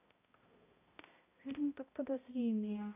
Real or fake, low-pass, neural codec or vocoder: fake; 3.6 kHz; codec, 16 kHz, 0.5 kbps, X-Codec, HuBERT features, trained on balanced general audio